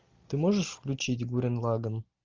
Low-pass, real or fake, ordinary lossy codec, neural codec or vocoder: 7.2 kHz; real; Opus, 24 kbps; none